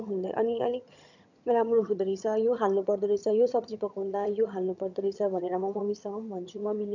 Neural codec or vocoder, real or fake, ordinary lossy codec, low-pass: vocoder, 22.05 kHz, 80 mel bands, HiFi-GAN; fake; none; 7.2 kHz